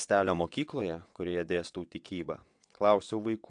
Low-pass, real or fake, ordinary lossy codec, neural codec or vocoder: 9.9 kHz; fake; AAC, 64 kbps; vocoder, 22.05 kHz, 80 mel bands, WaveNeXt